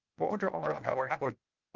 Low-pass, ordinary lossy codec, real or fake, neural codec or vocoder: 7.2 kHz; Opus, 32 kbps; fake; codec, 16 kHz, 0.8 kbps, ZipCodec